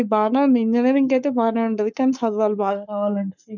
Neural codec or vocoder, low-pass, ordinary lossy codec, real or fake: codec, 44.1 kHz, 7.8 kbps, Pupu-Codec; 7.2 kHz; none; fake